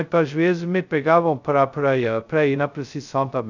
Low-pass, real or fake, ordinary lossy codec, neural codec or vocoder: 7.2 kHz; fake; none; codec, 16 kHz, 0.2 kbps, FocalCodec